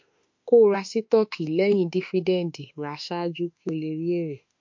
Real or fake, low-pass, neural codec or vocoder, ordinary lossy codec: fake; 7.2 kHz; autoencoder, 48 kHz, 32 numbers a frame, DAC-VAE, trained on Japanese speech; MP3, 48 kbps